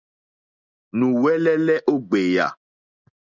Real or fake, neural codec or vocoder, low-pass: real; none; 7.2 kHz